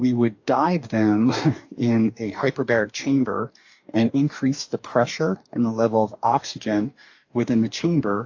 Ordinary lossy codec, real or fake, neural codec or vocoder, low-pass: AAC, 48 kbps; fake; codec, 44.1 kHz, 2.6 kbps, DAC; 7.2 kHz